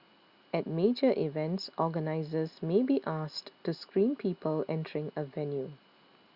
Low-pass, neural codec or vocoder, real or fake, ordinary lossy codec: 5.4 kHz; none; real; Opus, 64 kbps